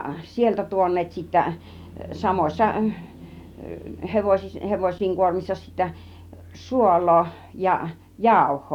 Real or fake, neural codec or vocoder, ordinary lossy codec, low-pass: real; none; none; 19.8 kHz